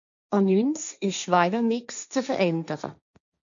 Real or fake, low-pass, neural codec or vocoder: fake; 7.2 kHz; codec, 16 kHz, 1.1 kbps, Voila-Tokenizer